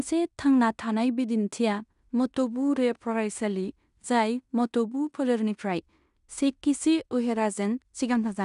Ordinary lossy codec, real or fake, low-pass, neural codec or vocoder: none; fake; 10.8 kHz; codec, 16 kHz in and 24 kHz out, 0.9 kbps, LongCat-Audio-Codec, fine tuned four codebook decoder